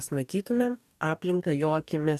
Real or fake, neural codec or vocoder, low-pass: fake; codec, 44.1 kHz, 2.6 kbps, DAC; 14.4 kHz